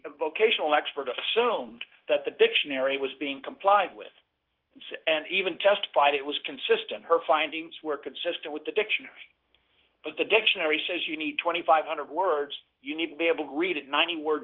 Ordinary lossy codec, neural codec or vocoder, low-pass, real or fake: Opus, 16 kbps; codec, 16 kHz, 0.9 kbps, LongCat-Audio-Codec; 5.4 kHz; fake